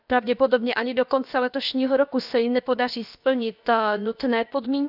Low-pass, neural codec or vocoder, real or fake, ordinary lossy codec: 5.4 kHz; codec, 16 kHz, about 1 kbps, DyCAST, with the encoder's durations; fake; none